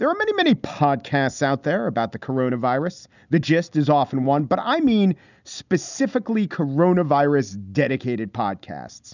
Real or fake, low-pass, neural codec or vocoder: real; 7.2 kHz; none